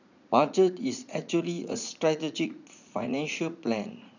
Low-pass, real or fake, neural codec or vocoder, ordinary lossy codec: 7.2 kHz; fake; vocoder, 44.1 kHz, 80 mel bands, Vocos; none